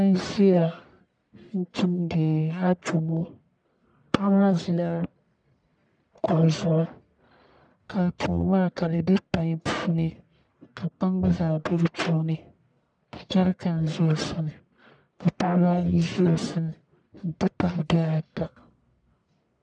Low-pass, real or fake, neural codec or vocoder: 9.9 kHz; fake; codec, 44.1 kHz, 1.7 kbps, Pupu-Codec